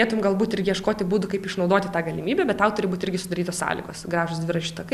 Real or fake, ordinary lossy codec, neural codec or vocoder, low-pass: real; Opus, 64 kbps; none; 14.4 kHz